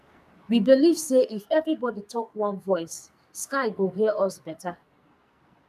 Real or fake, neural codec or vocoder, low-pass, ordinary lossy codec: fake; codec, 44.1 kHz, 2.6 kbps, SNAC; 14.4 kHz; none